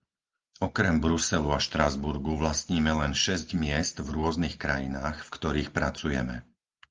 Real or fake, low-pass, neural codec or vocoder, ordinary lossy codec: real; 7.2 kHz; none; Opus, 32 kbps